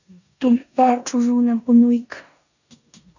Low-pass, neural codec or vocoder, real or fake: 7.2 kHz; codec, 16 kHz in and 24 kHz out, 0.9 kbps, LongCat-Audio-Codec, four codebook decoder; fake